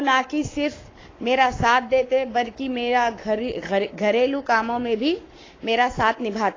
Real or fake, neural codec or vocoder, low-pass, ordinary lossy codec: fake; codec, 44.1 kHz, 7.8 kbps, Pupu-Codec; 7.2 kHz; AAC, 32 kbps